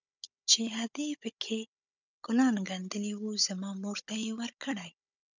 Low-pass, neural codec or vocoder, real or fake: 7.2 kHz; codec, 16 kHz, 16 kbps, FunCodec, trained on Chinese and English, 50 frames a second; fake